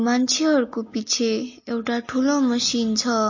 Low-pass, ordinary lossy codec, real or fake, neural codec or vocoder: 7.2 kHz; MP3, 32 kbps; real; none